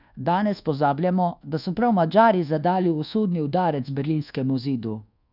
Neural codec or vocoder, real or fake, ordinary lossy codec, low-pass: codec, 24 kHz, 1.2 kbps, DualCodec; fake; none; 5.4 kHz